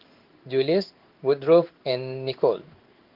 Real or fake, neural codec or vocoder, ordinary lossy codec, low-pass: fake; codec, 16 kHz in and 24 kHz out, 1 kbps, XY-Tokenizer; Opus, 32 kbps; 5.4 kHz